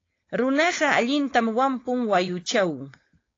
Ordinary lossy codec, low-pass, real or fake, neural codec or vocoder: AAC, 32 kbps; 7.2 kHz; fake; codec, 16 kHz, 4.8 kbps, FACodec